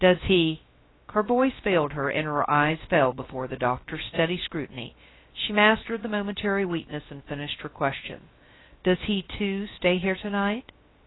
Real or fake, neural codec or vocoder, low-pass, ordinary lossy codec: fake; codec, 16 kHz, 0.3 kbps, FocalCodec; 7.2 kHz; AAC, 16 kbps